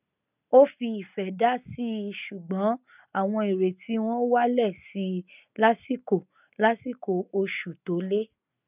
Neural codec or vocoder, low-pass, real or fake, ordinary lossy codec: none; 3.6 kHz; real; none